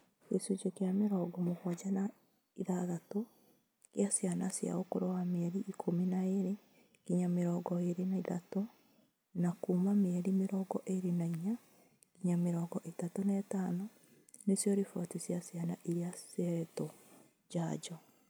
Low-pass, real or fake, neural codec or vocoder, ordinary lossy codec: none; real; none; none